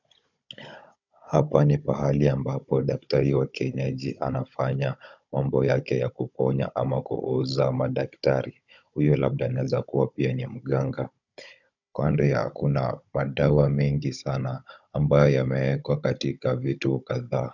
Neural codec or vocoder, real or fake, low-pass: codec, 16 kHz, 16 kbps, FunCodec, trained on Chinese and English, 50 frames a second; fake; 7.2 kHz